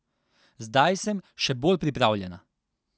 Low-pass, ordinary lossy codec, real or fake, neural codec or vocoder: none; none; real; none